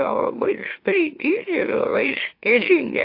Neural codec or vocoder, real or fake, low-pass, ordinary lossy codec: autoencoder, 44.1 kHz, a latent of 192 numbers a frame, MeloTTS; fake; 5.4 kHz; AAC, 32 kbps